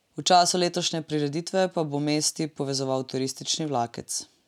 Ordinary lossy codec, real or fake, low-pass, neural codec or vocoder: none; real; 19.8 kHz; none